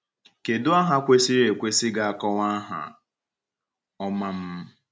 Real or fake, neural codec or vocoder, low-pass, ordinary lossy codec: real; none; none; none